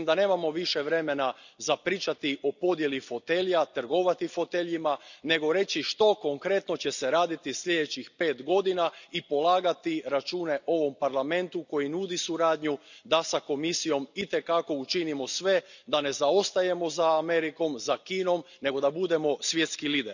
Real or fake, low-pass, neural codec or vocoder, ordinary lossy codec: real; 7.2 kHz; none; none